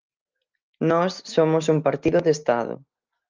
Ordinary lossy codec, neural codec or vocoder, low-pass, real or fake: Opus, 32 kbps; none; 7.2 kHz; real